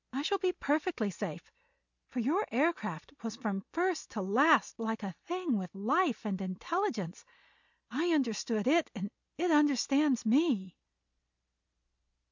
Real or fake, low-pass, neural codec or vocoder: real; 7.2 kHz; none